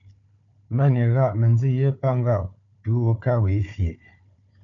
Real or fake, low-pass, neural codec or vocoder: fake; 7.2 kHz; codec, 16 kHz, 4 kbps, FunCodec, trained on Chinese and English, 50 frames a second